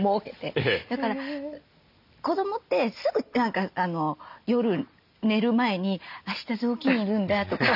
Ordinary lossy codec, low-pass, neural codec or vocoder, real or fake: none; 5.4 kHz; none; real